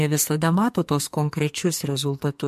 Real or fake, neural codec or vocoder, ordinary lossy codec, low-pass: fake; codec, 44.1 kHz, 2.6 kbps, SNAC; MP3, 64 kbps; 14.4 kHz